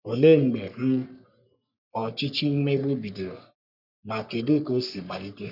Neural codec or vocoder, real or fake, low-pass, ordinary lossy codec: codec, 44.1 kHz, 3.4 kbps, Pupu-Codec; fake; 5.4 kHz; none